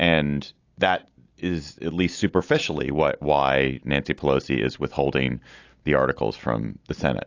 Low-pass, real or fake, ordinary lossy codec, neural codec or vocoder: 7.2 kHz; real; AAC, 48 kbps; none